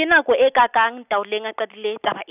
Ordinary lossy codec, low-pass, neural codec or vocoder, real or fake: none; 3.6 kHz; none; real